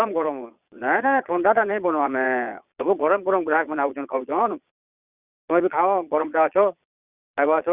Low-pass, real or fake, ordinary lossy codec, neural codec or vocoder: 3.6 kHz; fake; Opus, 64 kbps; vocoder, 22.05 kHz, 80 mel bands, Vocos